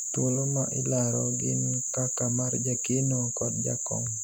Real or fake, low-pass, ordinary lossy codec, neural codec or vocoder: real; none; none; none